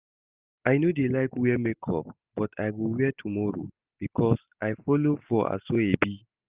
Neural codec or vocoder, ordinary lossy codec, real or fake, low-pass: none; Opus, 24 kbps; real; 3.6 kHz